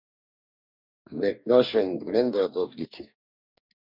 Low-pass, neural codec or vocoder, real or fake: 5.4 kHz; codec, 44.1 kHz, 2.6 kbps, DAC; fake